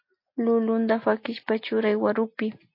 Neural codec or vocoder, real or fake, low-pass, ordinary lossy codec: none; real; 5.4 kHz; MP3, 32 kbps